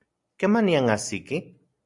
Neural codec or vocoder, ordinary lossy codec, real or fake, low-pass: none; AAC, 64 kbps; real; 10.8 kHz